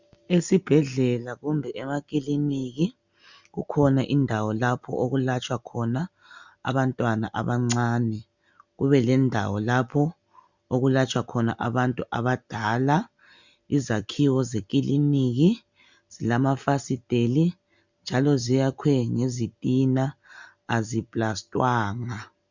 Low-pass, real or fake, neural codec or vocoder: 7.2 kHz; real; none